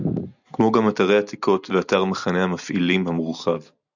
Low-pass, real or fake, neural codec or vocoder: 7.2 kHz; real; none